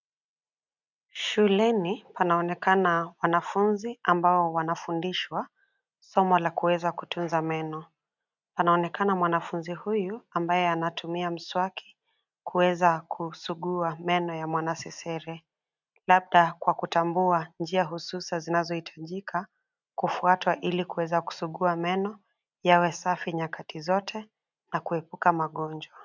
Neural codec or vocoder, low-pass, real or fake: none; 7.2 kHz; real